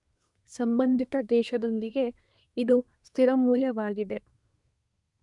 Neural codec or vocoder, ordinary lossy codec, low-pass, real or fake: codec, 24 kHz, 1 kbps, SNAC; none; 10.8 kHz; fake